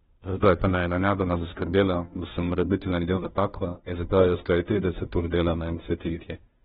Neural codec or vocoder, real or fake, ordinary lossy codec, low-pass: codec, 16 kHz, 0.5 kbps, FunCodec, trained on Chinese and English, 25 frames a second; fake; AAC, 16 kbps; 7.2 kHz